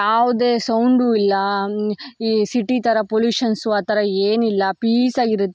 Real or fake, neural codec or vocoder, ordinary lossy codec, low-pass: real; none; none; none